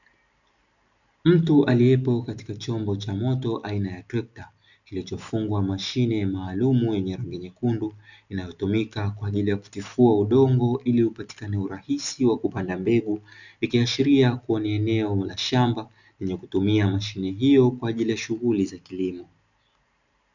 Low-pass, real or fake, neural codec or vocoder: 7.2 kHz; real; none